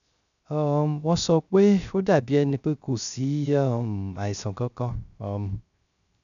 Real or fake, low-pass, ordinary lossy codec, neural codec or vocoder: fake; 7.2 kHz; none; codec, 16 kHz, 0.3 kbps, FocalCodec